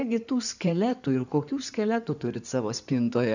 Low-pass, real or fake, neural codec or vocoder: 7.2 kHz; fake; codec, 16 kHz in and 24 kHz out, 2.2 kbps, FireRedTTS-2 codec